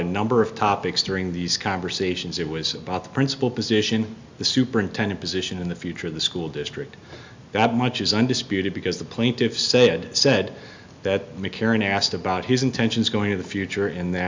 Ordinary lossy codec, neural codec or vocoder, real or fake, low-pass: MP3, 64 kbps; none; real; 7.2 kHz